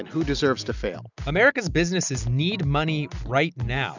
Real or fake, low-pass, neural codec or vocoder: real; 7.2 kHz; none